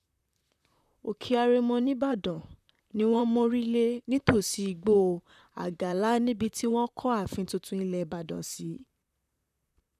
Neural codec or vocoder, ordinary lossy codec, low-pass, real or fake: vocoder, 44.1 kHz, 128 mel bands, Pupu-Vocoder; none; 14.4 kHz; fake